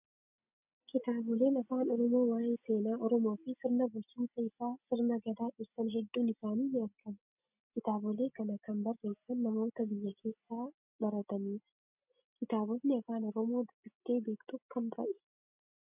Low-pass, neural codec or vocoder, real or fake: 3.6 kHz; none; real